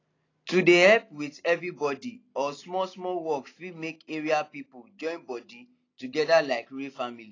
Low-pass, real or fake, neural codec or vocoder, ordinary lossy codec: 7.2 kHz; real; none; AAC, 32 kbps